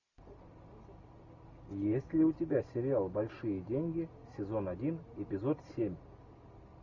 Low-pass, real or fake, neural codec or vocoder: 7.2 kHz; real; none